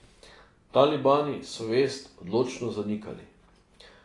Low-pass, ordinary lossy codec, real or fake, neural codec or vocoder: 10.8 kHz; AAC, 32 kbps; real; none